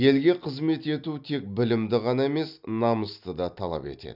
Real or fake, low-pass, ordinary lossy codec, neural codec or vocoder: real; 5.4 kHz; none; none